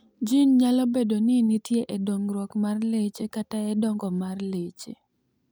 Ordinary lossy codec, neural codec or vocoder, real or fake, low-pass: none; none; real; none